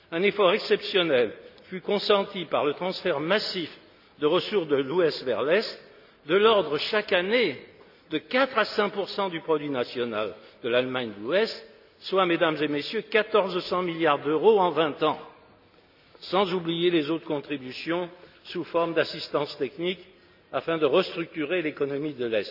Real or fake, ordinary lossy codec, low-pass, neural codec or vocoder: real; none; 5.4 kHz; none